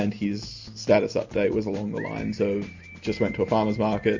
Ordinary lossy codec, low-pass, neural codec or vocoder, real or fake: MP3, 48 kbps; 7.2 kHz; none; real